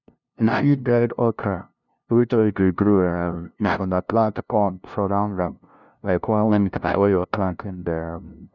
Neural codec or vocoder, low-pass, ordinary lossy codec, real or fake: codec, 16 kHz, 0.5 kbps, FunCodec, trained on LibriTTS, 25 frames a second; 7.2 kHz; none; fake